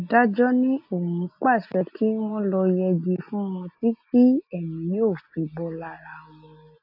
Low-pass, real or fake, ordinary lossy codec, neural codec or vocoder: 5.4 kHz; real; AAC, 48 kbps; none